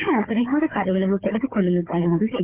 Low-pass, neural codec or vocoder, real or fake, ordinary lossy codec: 3.6 kHz; codec, 16 kHz, 4 kbps, FunCodec, trained on Chinese and English, 50 frames a second; fake; Opus, 32 kbps